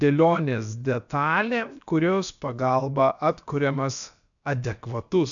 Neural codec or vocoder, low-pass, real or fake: codec, 16 kHz, about 1 kbps, DyCAST, with the encoder's durations; 7.2 kHz; fake